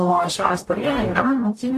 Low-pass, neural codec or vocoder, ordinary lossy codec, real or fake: 14.4 kHz; codec, 44.1 kHz, 0.9 kbps, DAC; AAC, 48 kbps; fake